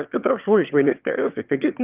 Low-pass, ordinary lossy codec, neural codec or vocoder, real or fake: 3.6 kHz; Opus, 24 kbps; autoencoder, 22.05 kHz, a latent of 192 numbers a frame, VITS, trained on one speaker; fake